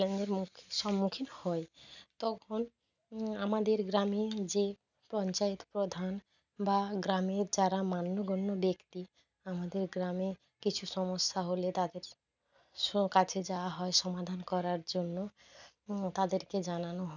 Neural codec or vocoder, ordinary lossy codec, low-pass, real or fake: none; none; 7.2 kHz; real